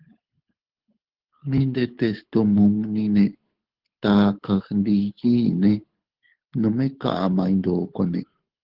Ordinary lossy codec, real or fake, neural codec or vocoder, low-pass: Opus, 16 kbps; fake; codec, 24 kHz, 6 kbps, HILCodec; 5.4 kHz